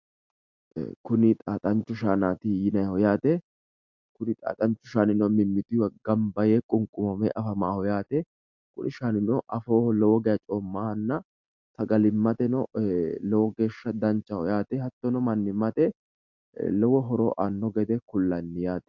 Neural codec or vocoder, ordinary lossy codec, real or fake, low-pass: none; MP3, 64 kbps; real; 7.2 kHz